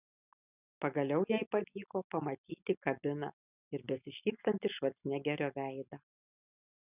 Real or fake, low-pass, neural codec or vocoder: real; 3.6 kHz; none